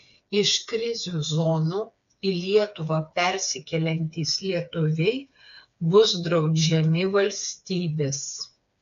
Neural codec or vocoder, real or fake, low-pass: codec, 16 kHz, 4 kbps, FreqCodec, smaller model; fake; 7.2 kHz